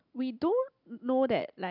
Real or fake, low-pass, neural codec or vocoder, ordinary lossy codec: real; 5.4 kHz; none; none